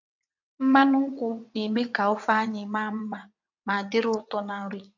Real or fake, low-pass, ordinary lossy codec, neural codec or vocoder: fake; 7.2 kHz; MP3, 48 kbps; vocoder, 22.05 kHz, 80 mel bands, WaveNeXt